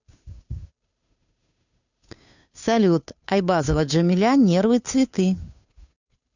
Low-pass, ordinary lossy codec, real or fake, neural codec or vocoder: 7.2 kHz; none; fake; codec, 16 kHz, 2 kbps, FunCodec, trained on Chinese and English, 25 frames a second